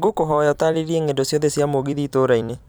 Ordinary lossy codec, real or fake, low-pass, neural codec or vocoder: none; fake; none; vocoder, 44.1 kHz, 128 mel bands every 256 samples, BigVGAN v2